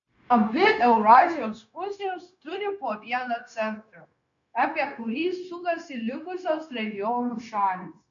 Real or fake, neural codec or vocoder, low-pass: fake; codec, 16 kHz, 0.9 kbps, LongCat-Audio-Codec; 7.2 kHz